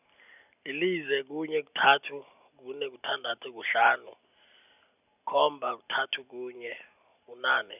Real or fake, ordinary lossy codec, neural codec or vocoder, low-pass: real; none; none; 3.6 kHz